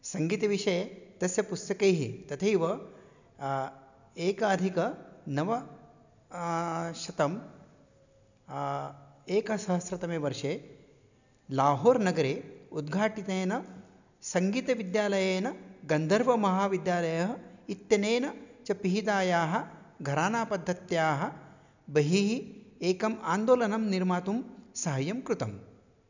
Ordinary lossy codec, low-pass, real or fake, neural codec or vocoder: none; 7.2 kHz; real; none